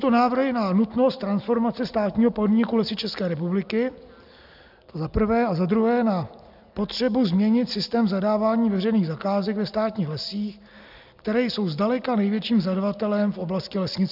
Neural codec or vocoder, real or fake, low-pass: none; real; 5.4 kHz